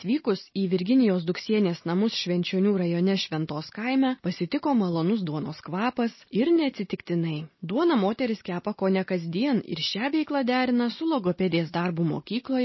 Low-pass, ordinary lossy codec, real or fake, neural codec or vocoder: 7.2 kHz; MP3, 24 kbps; real; none